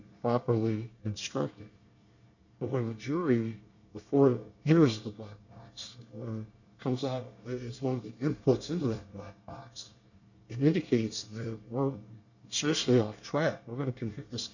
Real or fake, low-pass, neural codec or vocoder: fake; 7.2 kHz; codec, 24 kHz, 1 kbps, SNAC